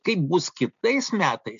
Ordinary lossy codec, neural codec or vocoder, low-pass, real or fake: MP3, 64 kbps; none; 7.2 kHz; real